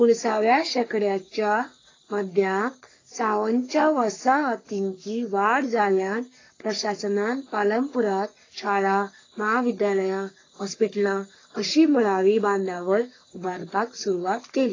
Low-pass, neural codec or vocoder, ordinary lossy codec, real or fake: 7.2 kHz; codec, 44.1 kHz, 3.4 kbps, Pupu-Codec; AAC, 32 kbps; fake